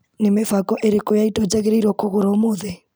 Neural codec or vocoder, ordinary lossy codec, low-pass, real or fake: none; none; none; real